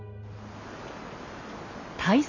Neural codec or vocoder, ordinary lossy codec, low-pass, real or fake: none; none; 7.2 kHz; real